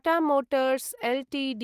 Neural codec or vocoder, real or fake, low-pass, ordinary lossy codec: none; real; 14.4 kHz; Opus, 24 kbps